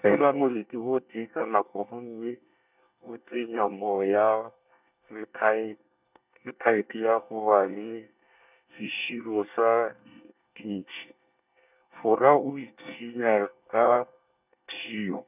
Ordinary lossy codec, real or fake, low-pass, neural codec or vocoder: none; fake; 3.6 kHz; codec, 24 kHz, 1 kbps, SNAC